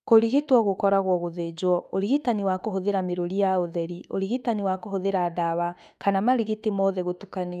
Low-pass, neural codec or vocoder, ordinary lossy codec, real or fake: 14.4 kHz; autoencoder, 48 kHz, 32 numbers a frame, DAC-VAE, trained on Japanese speech; none; fake